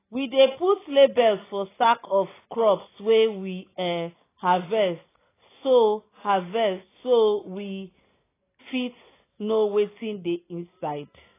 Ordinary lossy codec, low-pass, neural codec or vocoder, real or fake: AAC, 16 kbps; 3.6 kHz; none; real